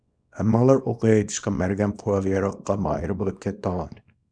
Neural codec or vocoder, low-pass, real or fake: codec, 24 kHz, 0.9 kbps, WavTokenizer, small release; 9.9 kHz; fake